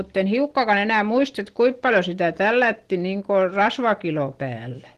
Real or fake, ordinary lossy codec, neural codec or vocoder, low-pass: real; Opus, 16 kbps; none; 14.4 kHz